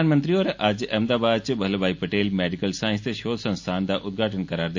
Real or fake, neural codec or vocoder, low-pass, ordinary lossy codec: real; none; 7.2 kHz; MP3, 48 kbps